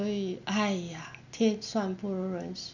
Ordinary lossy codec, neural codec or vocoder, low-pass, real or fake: none; none; 7.2 kHz; real